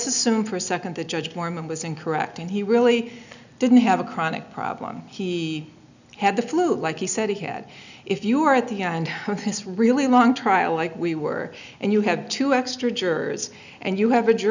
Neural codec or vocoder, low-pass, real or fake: none; 7.2 kHz; real